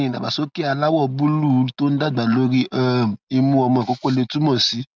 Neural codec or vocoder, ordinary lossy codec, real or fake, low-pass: none; none; real; none